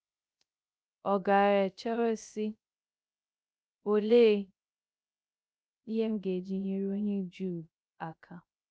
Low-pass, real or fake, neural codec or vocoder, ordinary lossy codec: none; fake; codec, 16 kHz, 0.3 kbps, FocalCodec; none